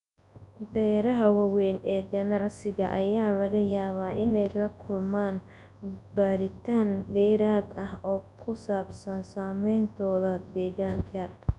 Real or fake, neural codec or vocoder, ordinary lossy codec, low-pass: fake; codec, 24 kHz, 0.9 kbps, WavTokenizer, large speech release; none; 10.8 kHz